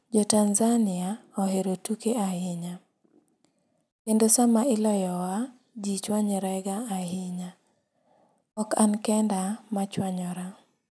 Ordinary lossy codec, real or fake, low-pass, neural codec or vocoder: none; real; none; none